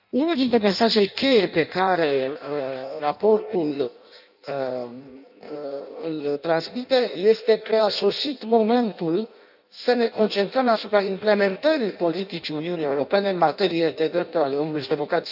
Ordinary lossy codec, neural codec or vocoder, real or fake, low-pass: none; codec, 16 kHz in and 24 kHz out, 0.6 kbps, FireRedTTS-2 codec; fake; 5.4 kHz